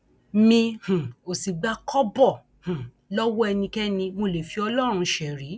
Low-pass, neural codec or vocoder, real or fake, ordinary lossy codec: none; none; real; none